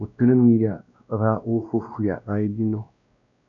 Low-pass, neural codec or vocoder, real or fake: 7.2 kHz; codec, 16 kHz, 1 kbps, X-Codec, WavLM features, trained on Multilingual LibriSpeech; fake